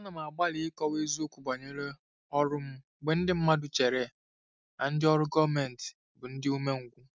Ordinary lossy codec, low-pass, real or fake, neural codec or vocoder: none; none; real; none